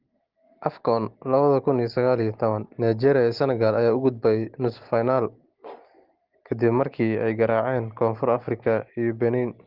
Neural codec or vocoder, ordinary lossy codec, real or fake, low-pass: none; Opus, 16 kbps; real; 5.4 kHz